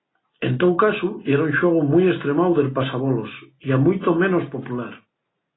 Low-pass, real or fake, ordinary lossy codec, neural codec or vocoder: 7.2 kHz; real; AAC, 16 kbps; none